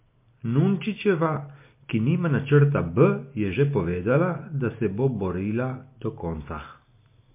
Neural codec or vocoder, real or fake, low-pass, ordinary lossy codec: none; real; 3.6 kHz; MP3, 24 kbps